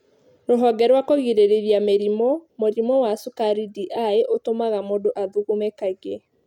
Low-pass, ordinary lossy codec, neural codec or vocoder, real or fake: 19.8 kHz; none; none; real